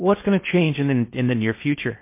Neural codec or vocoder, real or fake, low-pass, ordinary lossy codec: codec, 16 kHz in and 24 kHz out, 0.6 kbps, FocalCodec, streaming, 2048 codes; fake; 3.6 kHz; MP3, 24 kbps